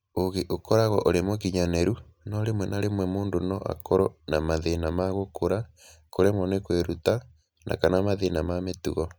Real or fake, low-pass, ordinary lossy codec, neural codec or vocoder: real; none; none; none